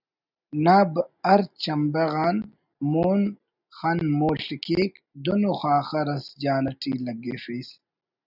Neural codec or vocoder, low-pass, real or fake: none; 5.4 kHz; real